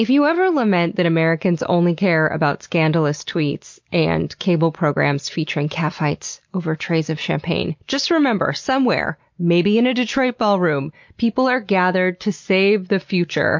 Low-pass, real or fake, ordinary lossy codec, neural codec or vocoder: 7.2 kHz; real; MP3, 48 kbps; none